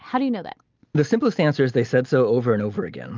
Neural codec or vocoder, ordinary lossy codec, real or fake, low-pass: none; Opus, 24 kbps; real; 7.2 kHz